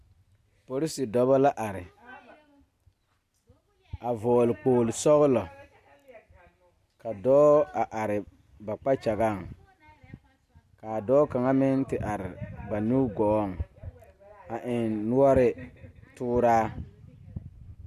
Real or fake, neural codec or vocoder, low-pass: real; none; 14.4 kHz